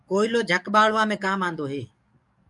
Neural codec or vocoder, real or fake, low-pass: codec, 44.1 kHz, 7.8 kbps, DAC; fake; 10.8 kHz